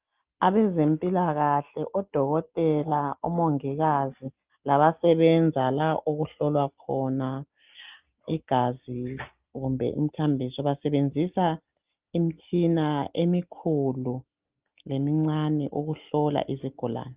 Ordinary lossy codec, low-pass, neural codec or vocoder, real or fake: Opus, 32 kbps; 3.6 kHz; none; real